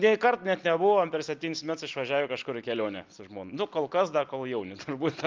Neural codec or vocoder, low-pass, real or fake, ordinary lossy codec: none; 7.2 kHz; real; Opus, 32 kbps